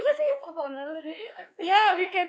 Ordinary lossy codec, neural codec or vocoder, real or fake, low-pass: none; codec, 16 kHz, 2 kbps, X-Codec, WavLM features, trained on Multilingual LibriSpeech; fake; none